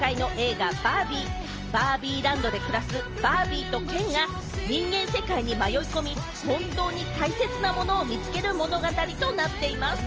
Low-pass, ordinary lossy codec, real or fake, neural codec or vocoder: 7.2 kHz; Opus, 24 kbps; real; none